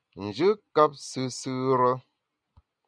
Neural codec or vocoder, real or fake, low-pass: none; real; 9.9 kHz